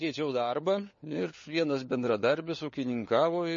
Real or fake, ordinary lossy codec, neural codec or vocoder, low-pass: fake; MP3, 32 kbps; codec, 16 kHz, 4 kbps, FunCodec, trained on LibriTTS, 50 frames a second; 7.2 kHz